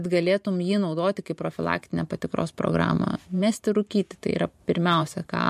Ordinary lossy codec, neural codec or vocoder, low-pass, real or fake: MP3, 64 kbps; vocoder, 44.1 kHz, 128 mel bands every 512 samples, BigVGAN v2; 14.4 kHz; fake